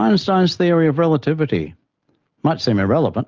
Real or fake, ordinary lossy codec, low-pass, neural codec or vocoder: real; Opus, 24 kbps; 7.2 kHz; none